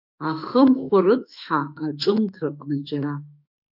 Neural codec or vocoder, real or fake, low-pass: autoencoder, 48 kHz, 32 numbers a frame, DAC-VAE, trained on Japanese speech; fake; 5.4 kHz